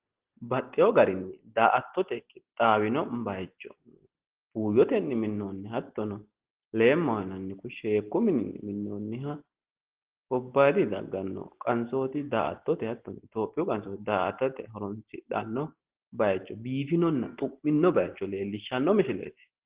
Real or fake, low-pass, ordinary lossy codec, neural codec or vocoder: real; 3.6 kHz; Opus, 16 kbps; none